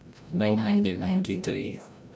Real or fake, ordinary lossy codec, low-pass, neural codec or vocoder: fake; none; none; codec, 16 kHz, 0.5 kbps, FreqCodec, larger model